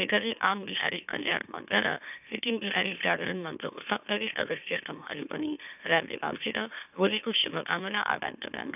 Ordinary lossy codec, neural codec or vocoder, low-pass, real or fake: none; autoencoder, 44.1 kHz, a latent of 192 numbers a frame, MeloTTS; 3.6 kHz; fake